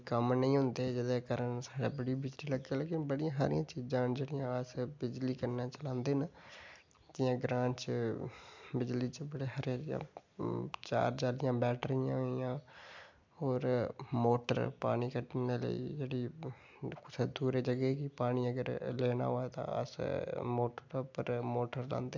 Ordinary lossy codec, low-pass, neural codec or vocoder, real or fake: MP3, 64 kbps; 7.2 kHz; none; real